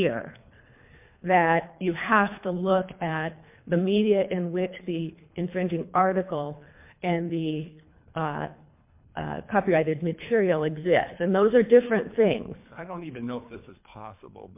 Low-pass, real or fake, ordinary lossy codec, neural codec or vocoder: 3.6 kHz; fake; MP3, 32 kbps; codec, 24 kHz, 3 kbps, HILCodec